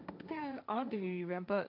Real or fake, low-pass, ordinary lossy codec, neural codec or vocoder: fake; 5.4 kHz; none; codec, 16 kHz, 1.1 kbps, Voila-Tokenizer